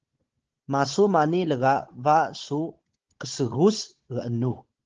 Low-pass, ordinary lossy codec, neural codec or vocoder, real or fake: 7.2 kHz; Opus, 16 kbps; codec, 16 kHz, 16 kbps, FunCodec, trained on Chinese and English, 50 frames a second; fake